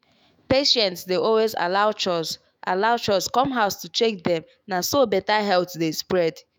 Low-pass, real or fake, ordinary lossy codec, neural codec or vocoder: none; fake; none; autoencoder, 48 kHz, 128 numbers a frame, DAC-VAE, trained on Japanese speech